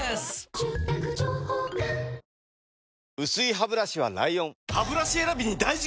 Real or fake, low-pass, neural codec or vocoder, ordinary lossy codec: real; none; none; none